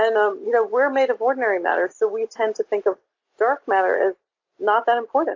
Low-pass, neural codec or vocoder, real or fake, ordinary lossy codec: 7.2 kHz; none; real; AAC, 48 kbps